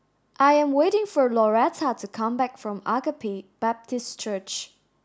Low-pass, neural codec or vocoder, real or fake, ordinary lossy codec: none; none; real; none